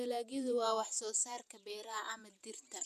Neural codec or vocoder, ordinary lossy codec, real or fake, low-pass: vocoder, 48 kHz, 128 mel bands, Vocos; none; fake; 14.4 kHz